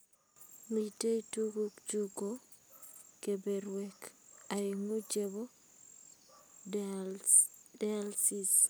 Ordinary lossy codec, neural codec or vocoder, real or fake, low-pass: none; none; real; none